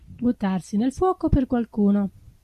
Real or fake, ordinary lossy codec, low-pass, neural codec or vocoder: real; AAC, 96 kbps; 14.4 kHz; none